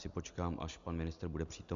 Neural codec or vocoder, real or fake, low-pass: none; real; 7.2 kHz